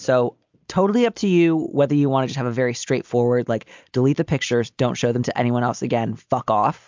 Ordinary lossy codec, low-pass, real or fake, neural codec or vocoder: MP3, 64 kbps; 7.2 kHz; real; none